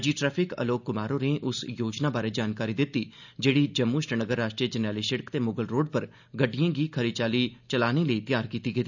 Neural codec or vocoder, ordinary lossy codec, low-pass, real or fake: none; none; 7.2 kHz; real